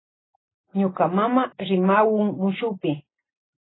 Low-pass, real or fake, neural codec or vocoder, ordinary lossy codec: 7.2 kHz; real; none; AAC, 16 kbps